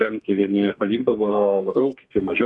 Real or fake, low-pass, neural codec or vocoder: fake; 10.8 kHz; codec, 32 kHz, 1.9 kbps, SNAC